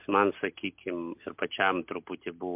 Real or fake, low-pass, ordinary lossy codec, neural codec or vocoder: real; 3.6 kHz; MP3, 32 kbps; none